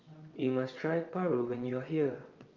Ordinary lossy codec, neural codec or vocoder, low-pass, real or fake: Opus, 24 kbps; vocoder, 22.05 kHz, 80 mel bands, WaveNeXt; 7.2 kHz; fake